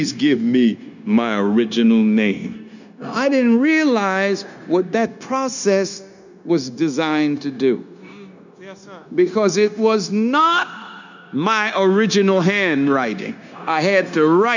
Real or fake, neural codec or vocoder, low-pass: fake; codec, 16 kHz, 0.9 kbps, LongCat-Audio-Codec; 7.2 kHz